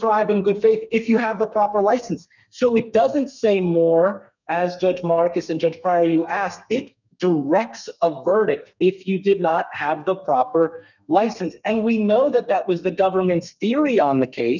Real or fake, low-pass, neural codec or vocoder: fake; 7.2 kHz; codec, 32 kHz, 1.9 kbps, SNAC